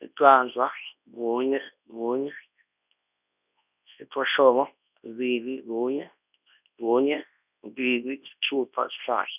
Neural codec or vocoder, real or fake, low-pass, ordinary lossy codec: codec, 24 kHz, 0.9 kbps, WavTokenizer, large speech release; fake; 3.6 kHz; none